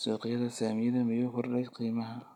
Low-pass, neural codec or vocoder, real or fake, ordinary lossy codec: 19.8 kHz; none; real; none